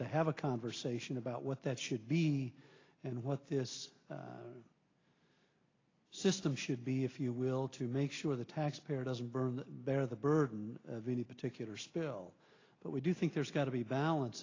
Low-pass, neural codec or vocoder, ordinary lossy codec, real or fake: 7.2 kHz; none; AAC, 32 kbps; real